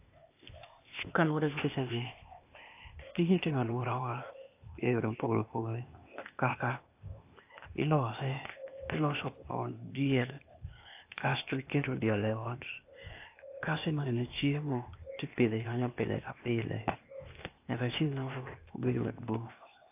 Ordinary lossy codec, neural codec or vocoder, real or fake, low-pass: AAC, 32 kbps; codec, 16 kHz, 0.8 kbps, ZipCodec; fake; 3.6 kHz